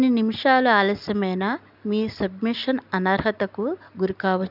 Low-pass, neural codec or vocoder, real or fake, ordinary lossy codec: 5.4 kHz; none; real; none